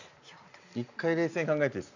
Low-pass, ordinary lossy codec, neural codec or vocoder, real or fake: 7.2 kHz; none; none; real